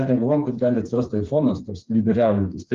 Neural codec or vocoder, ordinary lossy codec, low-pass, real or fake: codec, 16 kHz, 4 kbps, FreqCodec, smaller model; Opus, 32 kbps; 7.2 kHz; fake